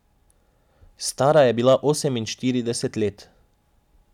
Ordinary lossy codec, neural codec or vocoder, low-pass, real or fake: none; none; 19.8 kHz; real